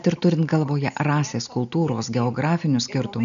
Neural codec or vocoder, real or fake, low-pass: none; real; 7.2 kHz